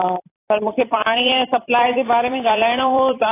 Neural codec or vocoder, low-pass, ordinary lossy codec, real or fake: vocoder, 44.1 kHz, 128 mel bands every 256 samples, BigVGAN v2; 3.6 kHz; AAC, 16 kbps; fake